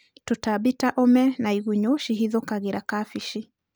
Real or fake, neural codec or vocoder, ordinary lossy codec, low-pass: real; none; none; none